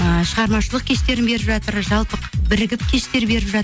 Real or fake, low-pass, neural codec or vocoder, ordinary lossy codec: real; none; none; none